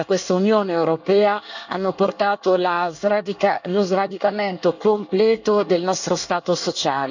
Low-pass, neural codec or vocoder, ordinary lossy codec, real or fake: 7.2 kHz; codec, 24 kHz, 1 kbps, SNAC; none; fake